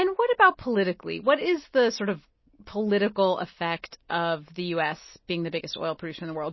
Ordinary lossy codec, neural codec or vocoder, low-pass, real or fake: MP3, 24 kbps; none; 7.2 kHz; real